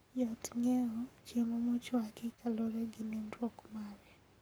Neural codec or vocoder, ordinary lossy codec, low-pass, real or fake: codec, 44.1 kHz, 7.8 kbps, Pupu-Codec; none; none; fake